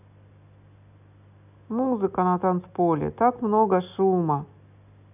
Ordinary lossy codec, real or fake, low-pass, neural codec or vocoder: none; real; 3.6 kHz; none